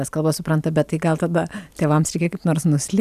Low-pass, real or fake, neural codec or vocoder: 14.4 kHz; real; none